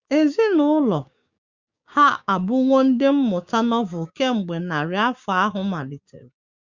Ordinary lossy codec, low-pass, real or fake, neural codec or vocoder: Opus, 64 kbps; 7.2 kHz; fake; codec, 16 kHz, 6 kbps, DAC